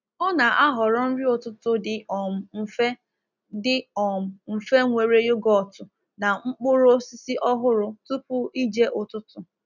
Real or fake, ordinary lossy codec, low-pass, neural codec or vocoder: real; none; 7.2 kHz; none